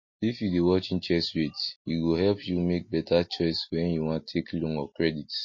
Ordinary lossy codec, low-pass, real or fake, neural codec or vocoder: MP3, 32 kbps; 7.2 kHz; real; none